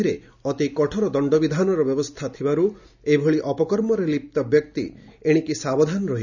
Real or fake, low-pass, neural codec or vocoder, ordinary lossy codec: real; 7.2 kHz; none; none